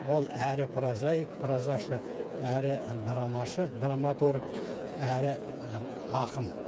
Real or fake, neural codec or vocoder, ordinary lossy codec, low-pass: fake; codec, 16 kHz, 4 kbps, FreqCodec, smaller model; none; none